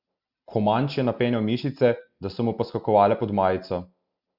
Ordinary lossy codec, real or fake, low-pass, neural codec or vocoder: Opus, 64 kbps; real; 5.4 kHz; none